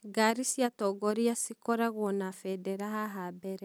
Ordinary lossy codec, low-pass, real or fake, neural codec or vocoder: none; none; real; none